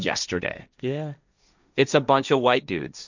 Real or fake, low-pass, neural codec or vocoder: fake; 7.2 kHz; codec, 16 kHz, 1.1 kbps, Voila-Tokenizer